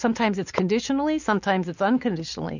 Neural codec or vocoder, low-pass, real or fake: none; 7.2 kHz; real